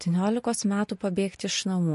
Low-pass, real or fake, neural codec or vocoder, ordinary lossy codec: 14.4 kHz; real; none; MP3, 48 kbps